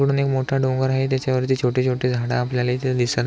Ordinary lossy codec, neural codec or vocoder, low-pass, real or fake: none; none; none; real